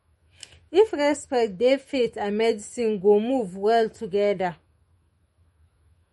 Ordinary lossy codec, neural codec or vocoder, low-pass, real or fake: MP3, 48 kbps; autoencoder, 48 kHz, 128 numbers a frame, DAC-VAE, trained on Japanese speech; 19.8 kHz; fake